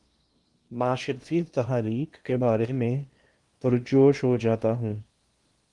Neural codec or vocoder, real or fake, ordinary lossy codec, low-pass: codec, 16 kHz in and 24 kHz out, 0.8 kbps, FocalCodec, streaming, 65536 codes; fake; Opus, 24 kbps; 10.8 kHz